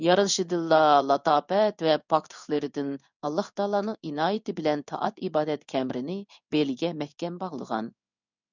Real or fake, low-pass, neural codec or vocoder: fake; 7.2 kHz; codec, 16 kHz in and 24 kHz out, 1 kbps, XY-Tokenizer